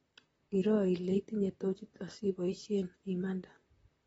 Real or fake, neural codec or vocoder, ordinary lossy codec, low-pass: fake; vocoder, 44.1 kHz, 128 mel bands, Pupu-Vocoder; AAC, 24 kbps; 19.8 kHz